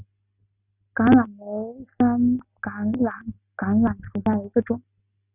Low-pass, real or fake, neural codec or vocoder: 3.6 kHz; real; none